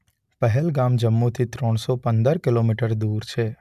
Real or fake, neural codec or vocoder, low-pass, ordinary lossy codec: real; none; 14.4 kHz; none